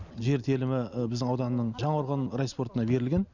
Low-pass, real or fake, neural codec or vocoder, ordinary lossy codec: 7.2 kHz; real; none; none